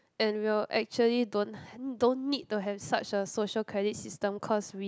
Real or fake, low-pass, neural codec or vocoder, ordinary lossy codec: real; none; none; none